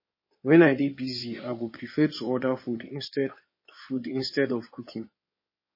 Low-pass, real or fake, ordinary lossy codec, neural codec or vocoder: 5.4 kHz; fake; MP3, 24 kbps; codec, 16 kHz in and 24 kHz out, 2.2 kbps, FireRedTTS-2 codec